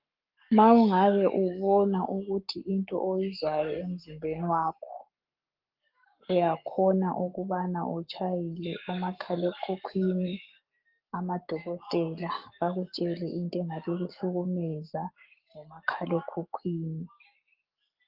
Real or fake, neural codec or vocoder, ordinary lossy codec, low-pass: fake; codec, 44.1 kHz, 7.8 kbps, Pupu-Codec; Opus, 32 kbps; 5.4 kHz